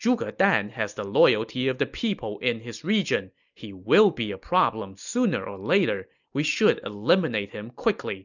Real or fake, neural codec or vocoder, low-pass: real; none; 7.2 kHz